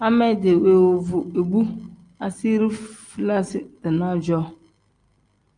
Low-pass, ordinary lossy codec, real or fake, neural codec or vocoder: 9.9 kHz; Opus, 32 kbps; real; none